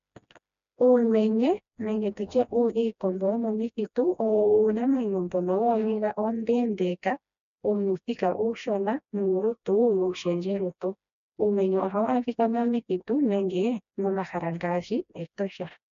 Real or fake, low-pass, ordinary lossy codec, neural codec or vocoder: fake; 7.2 kHz; AAC, 96 kbps; codec, 16 kHz, 1 kbps, FreqCodec, smaller model